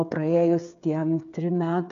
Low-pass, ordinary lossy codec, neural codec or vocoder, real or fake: 7.2 kHz; MP3, 64 kbps; codec, 16 kHz, 8 kbps, FreqCodec, smaller model; fake